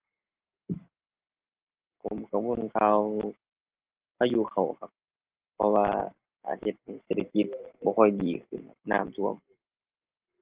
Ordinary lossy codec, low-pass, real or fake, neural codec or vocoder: Opus, 16 kbps; 3.6 kHz; real; none